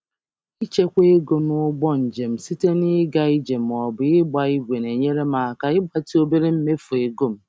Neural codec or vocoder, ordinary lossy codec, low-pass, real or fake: none; none; none; real